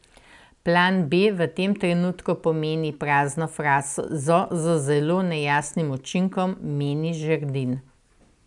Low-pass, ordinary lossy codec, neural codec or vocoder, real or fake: 10.8 kHz; none; none; real